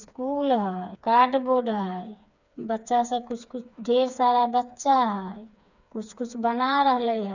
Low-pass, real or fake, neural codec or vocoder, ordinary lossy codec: 7.2 kHz; fake; codec, 16 kHz, 4 kbps, FreqCodec, smaller model; none